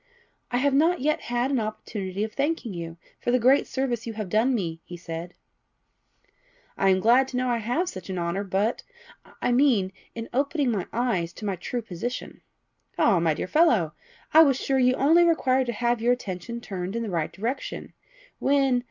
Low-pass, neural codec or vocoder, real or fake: 7.2 kHz; none; real